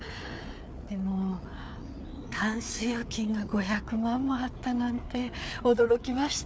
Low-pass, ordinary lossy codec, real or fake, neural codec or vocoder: none; none; fake; codec, 16 kHz, 4 kbps, FreqCodec, larger model